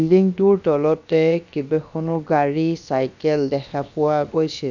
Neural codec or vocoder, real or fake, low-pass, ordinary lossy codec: codec, 16 kHz, about 1 kbps, DyCAST, with the encoder's durations; fake; 7.2 kHz; none